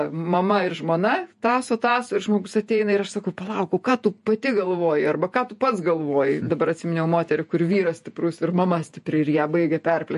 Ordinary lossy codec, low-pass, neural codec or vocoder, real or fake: MP3, 48 kbps; 14.4 kHz; vocoder, 48 kHz, 128 mel bands, Vocos; fake